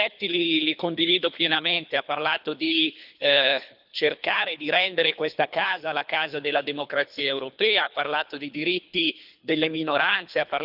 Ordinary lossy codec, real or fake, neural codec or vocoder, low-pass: none; fake; codec, 24 kHz, 3 kbps, HILCodec; 5.4 kHz